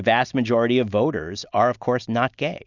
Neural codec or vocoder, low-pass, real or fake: none; 7.2 kHz; real